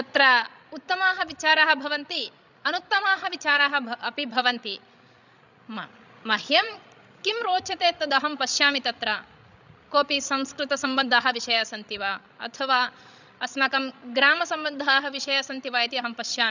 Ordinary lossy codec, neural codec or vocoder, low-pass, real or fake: none; codec, 16 kHz, 16 kbps, FreqCodec, larger model; 7.2 kHz; fake